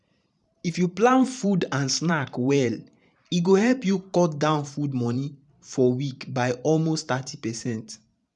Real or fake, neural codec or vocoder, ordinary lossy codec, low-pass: fake; vocoder, 44.1 kHz, 128 mel bands every 512 samples, BigVGAN v2; none; 10.8 kHz